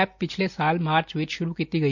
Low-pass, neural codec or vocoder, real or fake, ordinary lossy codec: 7.2 kHz; none; real; none